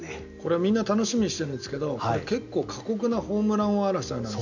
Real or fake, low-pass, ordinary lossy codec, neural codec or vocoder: real; 7.2 kHz; none; none